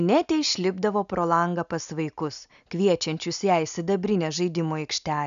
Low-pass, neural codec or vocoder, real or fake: 7.2 kHz; none; real